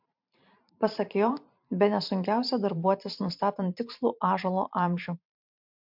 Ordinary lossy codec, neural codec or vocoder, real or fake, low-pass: MP3, 48 kbps; vocoder, 44.1 kHz, 128 mel bands every 512 samples, BigVGAN v2; fake; 5.4 kHz